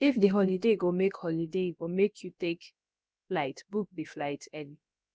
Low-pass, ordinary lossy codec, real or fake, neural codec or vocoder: none; none; fake; codec, 16 kHz, about 1 kbps, DyCAST, with the encoder's durations